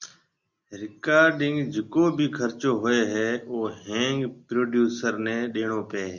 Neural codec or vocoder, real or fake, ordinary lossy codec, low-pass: none; real; Opus, 64 kbps; 7.2 kHz